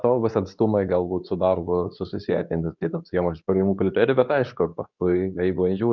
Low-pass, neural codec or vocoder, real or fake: 7.2 kHz; codec, 24 kHz, 0.9 kbps, WavTokenizer, medium speech release version 2; fake